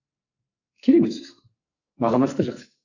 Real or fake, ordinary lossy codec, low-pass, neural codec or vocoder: fake; Opus, 64 kbps; 7.2 kHz; codec, 44.1 kHz, 2.6 kbps, SNAC